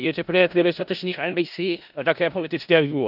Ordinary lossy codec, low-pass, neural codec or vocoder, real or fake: none; 5.4 kHz; codec, 16 kHz in and 24 kHz out, 0.4 kbps, LongCat-Audio-Codec, four codebook decoder; fake